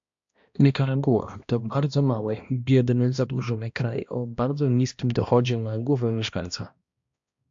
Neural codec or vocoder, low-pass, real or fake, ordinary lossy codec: codec, 16 kHz, 1 kbps, X-Codec, HuBERT features, trained on balanced general audio; 7.2 kHz; fake; AAC, 64 kbps